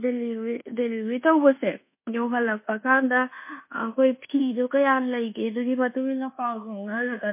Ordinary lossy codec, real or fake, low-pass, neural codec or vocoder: MP3, 24 kbps; fake; 3.6 kHz; codec, 24 kHz, 1.2 kbps, DualCodec